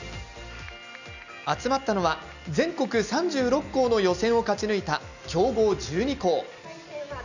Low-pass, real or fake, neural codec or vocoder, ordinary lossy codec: 7.2 kHz; real; none; none